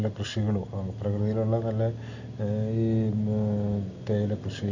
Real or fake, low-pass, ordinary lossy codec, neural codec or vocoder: real; 7.2 kHz; none; none